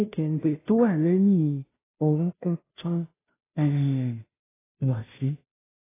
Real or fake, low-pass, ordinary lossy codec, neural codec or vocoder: fake; 3.6 kHz; AAC, 16 kbps; codec, 16 kHz, 0.5 kbps, FunCodec, trained on Chinese and English, 25 frames a second